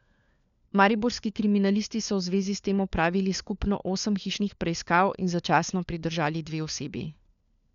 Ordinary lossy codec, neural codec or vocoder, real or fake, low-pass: none; codec, 16 kHz, 4 kbps, FunCodec, trained on LibriTTS, 50 frames a second; fake; 7.2 kHz